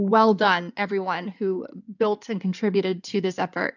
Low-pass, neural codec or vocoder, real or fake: 7.2 kHz; codec, 16 kHz in and 24 kHz out, 2.2 kbps, FireRedTTS-2 codec; fake